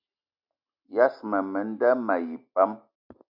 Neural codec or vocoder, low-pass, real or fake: none; 5.4 kHz; real